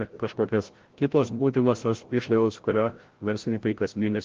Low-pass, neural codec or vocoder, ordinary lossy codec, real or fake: 7.2 kHz; codec, 16 kHz, 0.5 kbps, FreqCodec, larger model; Opus, 16 kbps; fake